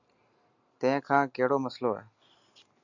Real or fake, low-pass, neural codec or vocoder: real; 7.2 kHz; none